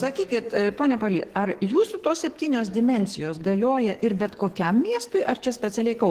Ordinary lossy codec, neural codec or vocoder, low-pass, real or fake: Opus, 16 kbps; codec, 44.1 kHz, 2.6 kbps, SNAC; 14.4 kHz; fake